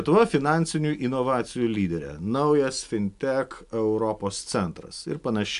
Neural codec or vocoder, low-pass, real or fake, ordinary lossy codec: none; 10.8 kHz; real; AAC, 96 kbps